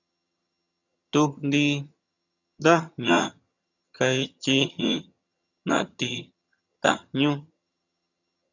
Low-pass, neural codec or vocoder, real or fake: 7.2 kHz; vocoder, 22.05 kHz, 80 mel bands, HiFi-GAN; fake